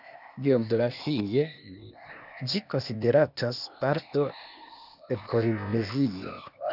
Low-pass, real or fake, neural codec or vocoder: 5.4 kHz; fake; codec, 16 kHz, 0.8 kbps, ZipCodec